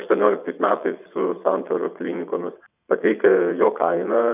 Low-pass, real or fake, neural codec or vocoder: 3.6 kHz; fake; vocoder, 22.05 kHz, 80 mel bands, WaveNeXt